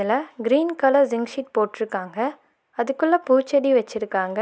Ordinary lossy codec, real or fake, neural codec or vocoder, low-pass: none; real; none; none